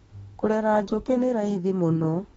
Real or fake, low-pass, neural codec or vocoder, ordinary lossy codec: fake; 19.8 kHz; autoencoder, 48 kHz, 32 numbers a frame, DAC-VAE, trained on Japanese speech; AAC, 24 kbps